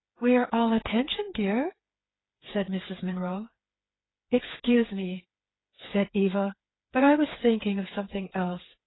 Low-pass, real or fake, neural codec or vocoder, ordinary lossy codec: 7.2 kHz; fake; codec, 16 kHz, 4 kbps, FreqCodec, smaller model; AAC, 16 kbps